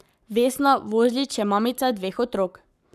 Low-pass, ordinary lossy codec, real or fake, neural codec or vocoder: 14.4 kHz; none; fake; codec, 44.1 kHz, 7.8 kbps, Pupu-Codec